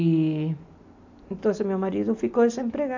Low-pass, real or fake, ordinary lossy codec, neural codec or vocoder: 7.2 kHz; real; AAC, 48 kbps; none